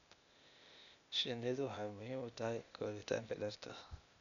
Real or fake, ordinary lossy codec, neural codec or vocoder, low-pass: fake; none; codec, 16 kHz, 0.8 kbps, ZipCodec; 7.2 kHz